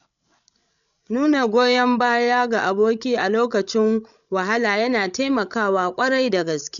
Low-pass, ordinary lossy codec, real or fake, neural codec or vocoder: 7.2 kHz; none; fake; codec, 16 kHz, 16 kbps, FreqCodec, larger model